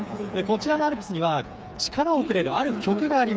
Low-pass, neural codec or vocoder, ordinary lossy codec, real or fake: none; codec, 16 kHz, 4 kbps, FreqCodec, smaller model; none; fake